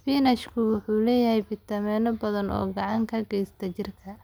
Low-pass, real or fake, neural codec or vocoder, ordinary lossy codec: none; real; none; none